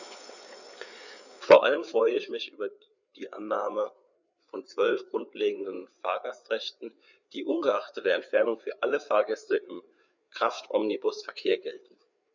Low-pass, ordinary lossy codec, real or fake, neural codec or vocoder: 7.2 kHz; none; fake; codec, 16 kHz, 4 kbps, FreqCodec, larger model